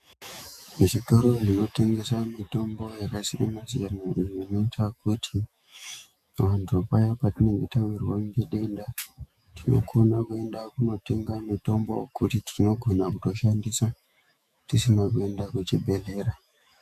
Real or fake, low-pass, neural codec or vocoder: fake; 14.4 kHz; autoencoder, 48 kHz, 128 numbers a frame, DAC-VAE, trained on Japanese speech